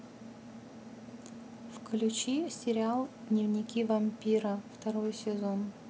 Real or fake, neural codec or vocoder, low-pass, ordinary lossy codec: real; none; none; none